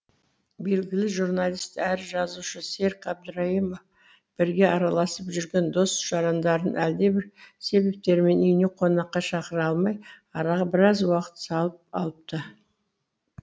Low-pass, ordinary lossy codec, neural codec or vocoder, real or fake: none; none; none; real